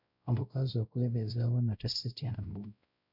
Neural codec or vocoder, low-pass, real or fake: codec, 16 kHz, 0.5 kbps, X-Codec, WavLM features, trained on Multilingual LibriSpeech; 5.4 kHz; fake